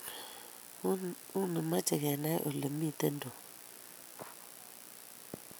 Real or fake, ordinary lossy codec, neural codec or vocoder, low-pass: real; none; none; none